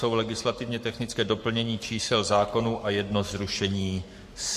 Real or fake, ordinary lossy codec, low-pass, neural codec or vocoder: fake; MP3, 64 kbps; 14.4 kHz; codec, 44.1 kHz, 7.8 kbps, Pupu-Codec